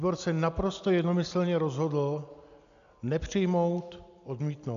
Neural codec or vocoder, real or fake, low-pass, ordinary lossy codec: none; real; 7.2 kHz; AAC, 96 kbps